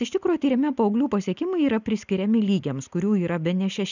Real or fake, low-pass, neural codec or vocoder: real; 7.2 kHz; none